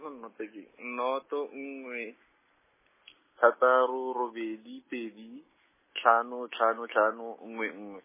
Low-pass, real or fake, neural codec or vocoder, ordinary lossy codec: 3.6 kHz; real; none; MP3, 16 kbps